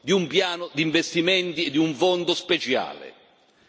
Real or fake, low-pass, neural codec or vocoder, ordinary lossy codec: real; none; none; none